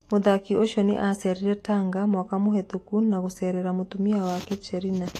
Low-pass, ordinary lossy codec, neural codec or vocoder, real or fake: 14.4 kHz; AAC, 48 kbps; autoencoder, 48 kHz, 128 numbers a frame, DAC-VAE, trained on Japanese speech; fake